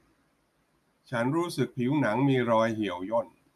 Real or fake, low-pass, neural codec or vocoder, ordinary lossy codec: real; 14.4 kHz; none; none